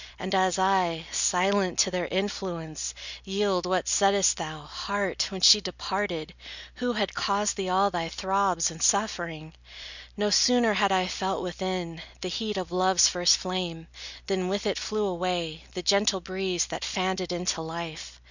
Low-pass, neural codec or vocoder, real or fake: 7.2 kHz; none; real